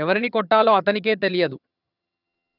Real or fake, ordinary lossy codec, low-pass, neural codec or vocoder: fake; none; 5.4 kHz; vocoder, 22.05 kHz, 80 mel bands, HiFi-GAN